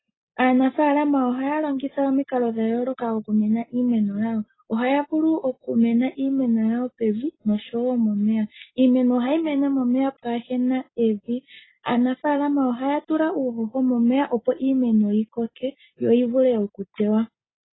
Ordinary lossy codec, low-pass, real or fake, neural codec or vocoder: AAC, 16 kbps; 7.2 kHz; real; none